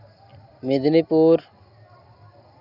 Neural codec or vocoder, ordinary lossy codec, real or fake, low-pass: none; Opus, 64 kbps; real; 5.4 kHz